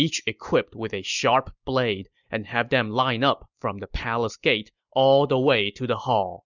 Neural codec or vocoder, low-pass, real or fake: none; 7.2 kHz; real